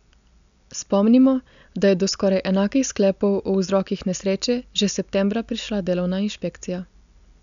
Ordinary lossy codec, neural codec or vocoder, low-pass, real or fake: MP3, 96 kbps; none; 7.2 kHz; real